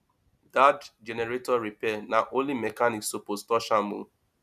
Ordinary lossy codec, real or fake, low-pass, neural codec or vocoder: none; real; 14.4 kHz; none